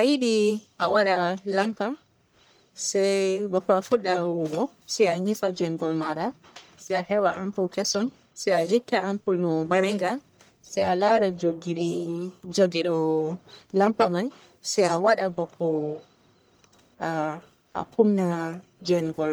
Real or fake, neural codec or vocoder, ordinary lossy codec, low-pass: fake; codec, 44.1 kHz, 1.7 kbps, Pupu-Codec; none; none